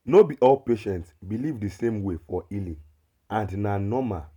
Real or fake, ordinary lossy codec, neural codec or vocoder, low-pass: real; none; none; 19.8 kHz